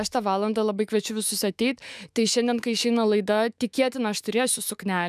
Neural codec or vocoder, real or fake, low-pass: autoencoder, 48 kHz, 128 numbers a frame, DAC-VAE, trained on Japanese speech; fake; 14.4 kHz